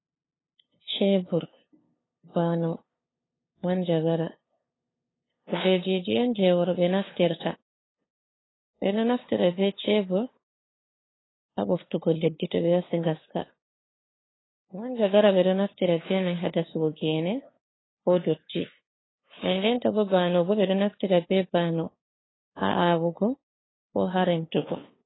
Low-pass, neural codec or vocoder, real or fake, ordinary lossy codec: 7.2 kHz; codec, 16 kHz, 2 kbps, FunCodec, trained on LibriTTS, 25 frames a second; fake; AAC, 16 kbps